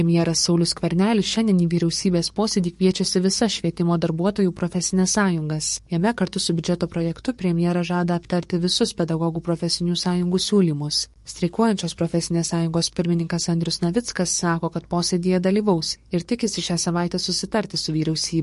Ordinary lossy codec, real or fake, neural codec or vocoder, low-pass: MP3, 48 kbps; fake; codec, 44.1 kHz, 7.8 kbps, DAC; 14.4 kHz